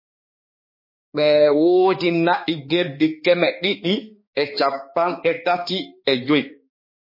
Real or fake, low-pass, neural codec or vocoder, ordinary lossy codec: fake; 5.4 kHz; codec, 16 kHz, 2 kbps, X-Codec, HuBERT features, trained on balanced general audio; MP3, 24 kbps